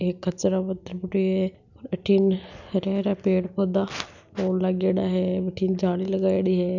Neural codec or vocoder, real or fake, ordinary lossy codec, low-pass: none; real; none; 7.2 kHz